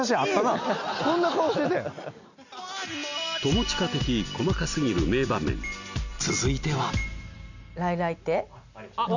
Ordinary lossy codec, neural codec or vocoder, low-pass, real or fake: none; none; 7.2 kHz; real